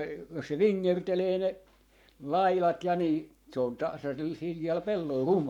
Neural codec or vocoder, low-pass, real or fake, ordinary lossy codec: codec, 44.1 kHz, 7.8 kbps, Pupu-Codec; 19.8 kHz; fake; none